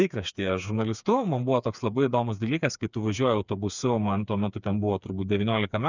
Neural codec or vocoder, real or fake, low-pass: codec, 16 kHz, 4 kbps, FreqCodec, smaller model; fake; 7.2 kHz